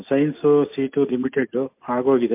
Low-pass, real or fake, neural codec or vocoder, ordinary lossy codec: 3.6 kHz; real; none; MP3, 32 kbps